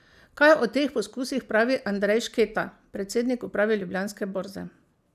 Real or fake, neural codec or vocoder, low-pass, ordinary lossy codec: real; none; 14.4 kHz; none